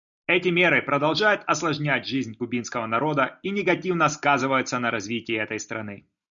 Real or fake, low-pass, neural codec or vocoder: real; 7.2 kHz; none